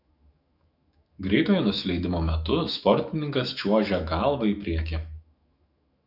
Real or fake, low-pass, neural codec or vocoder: fake; 5.4 kHz; autoencoder, 48 kHz, 128 numbers a frame, DAC-VAE, trained on Japanese speech